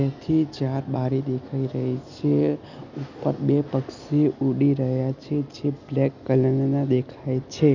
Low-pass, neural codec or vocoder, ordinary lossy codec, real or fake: 7.2 kHz; none; none; real